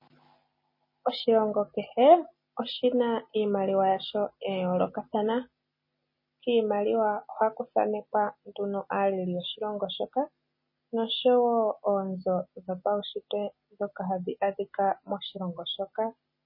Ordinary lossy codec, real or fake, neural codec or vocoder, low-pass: MP3, 24 kbps; real; none; 5.4 kHz